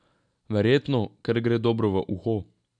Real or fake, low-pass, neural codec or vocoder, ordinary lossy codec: real; 9.9 kHz; none; none